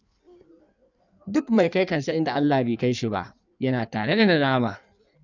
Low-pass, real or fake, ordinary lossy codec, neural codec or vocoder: 7.2 kHz; fake; none; codec, 16 kHz in and 24 kHz out, 1.1 kbps, FireRedTTS-2 codec